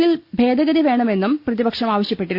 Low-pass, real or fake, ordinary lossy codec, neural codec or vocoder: 5.4 kHz; real; Opus, 64 kbps; none